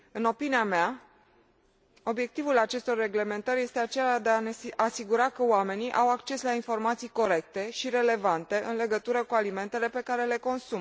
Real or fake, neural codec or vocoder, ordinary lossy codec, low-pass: real; none; none; none